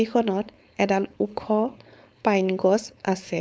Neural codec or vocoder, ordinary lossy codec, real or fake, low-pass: codec, 16 kHz, 4.8 kbps, FACodec; none; fake; none